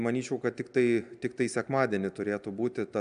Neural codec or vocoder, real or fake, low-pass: none; real; 9.9 kHz